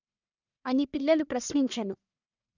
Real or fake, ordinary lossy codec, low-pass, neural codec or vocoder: fake; none; 7.2 kHz; codec, 44.1 kHz, 1.7 kbps, Pupu-Codec